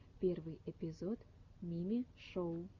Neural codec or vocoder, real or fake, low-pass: none; real; 7.2 kHz